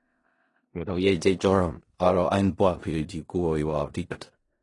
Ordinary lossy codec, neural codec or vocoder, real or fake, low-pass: AAC, 32 kbps; codec, 16 kHz in and 24 kHz out, 0.4 kbps, LongCat-Audio-Codec, four codebook decoder; fake; 10.8 kHz